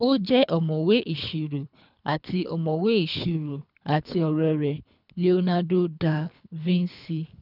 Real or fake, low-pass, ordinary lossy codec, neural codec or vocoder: fake; 5.4 kHz; none; codec, 24 kHz, 3 kbps, HILCodec